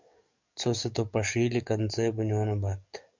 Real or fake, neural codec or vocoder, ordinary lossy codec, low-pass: fake; codec, 44.1 kHz, 7.8 kbps, DAC; MP3, 64 kbps; 7.2 kHz